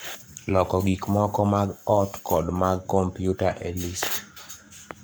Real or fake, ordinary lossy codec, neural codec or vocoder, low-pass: fake; none; codec, 44.1 kHz, 7.8 kbps, Pupu-Codec; none